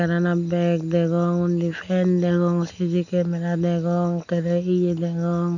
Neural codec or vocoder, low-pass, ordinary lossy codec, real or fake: none; 7.2 kHz; none; real